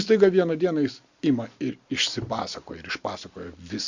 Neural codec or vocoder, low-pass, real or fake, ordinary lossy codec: none; 7.2 kHz; real; Opus, 64 kbps